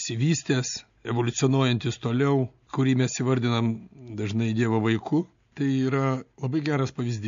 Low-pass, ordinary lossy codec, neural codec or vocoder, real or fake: 7.2 kHz; MP3, 64 kbps; none; real